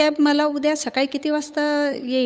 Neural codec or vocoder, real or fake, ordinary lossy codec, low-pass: codec, 16 kHz, 8 kbps, FunCodec, trained on Chinese and English, 25 frames a second; fake; none; none